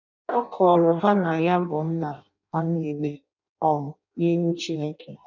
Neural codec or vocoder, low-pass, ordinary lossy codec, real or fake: codec, 16 kHz in and 24 kHz out, 0.6 kbps, FireRedTTS-2 codec; 7.2 kHz; none; fake